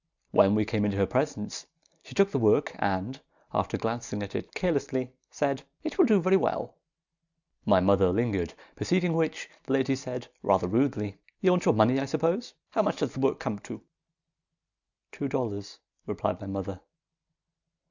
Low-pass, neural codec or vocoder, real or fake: 7.2 kHz; none; real